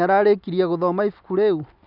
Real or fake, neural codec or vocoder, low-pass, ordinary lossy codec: real; none; 5.4 kHz; none